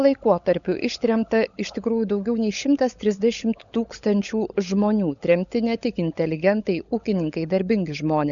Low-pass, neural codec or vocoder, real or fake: 7.2 kHz; codec, 16 kHz, 16 kbps, FunCodec, trained on LibriTTS, 50 frames a second; fake